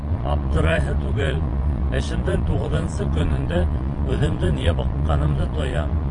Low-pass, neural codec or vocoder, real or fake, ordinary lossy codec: 9.9 kHz; vocoder, 22.05 kHz, 80 mel bands, Vocos; fake; MP3, 48 kbps